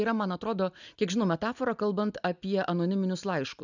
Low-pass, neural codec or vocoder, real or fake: 7.2 kHz; none; real